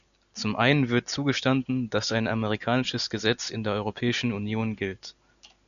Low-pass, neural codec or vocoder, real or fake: 7.2 kHz; none; real